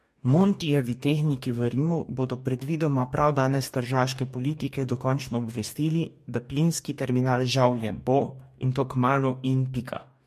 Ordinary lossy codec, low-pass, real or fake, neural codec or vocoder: MP3, 64 kbps; 14.4 kHz; fake; codec, 44.1 kHz, 2.6 kbps, DAC